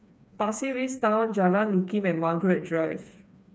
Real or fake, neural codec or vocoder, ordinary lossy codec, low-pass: fake; codec, 16 kHz, 4 kbps, FreqCodec, smaller model; none; none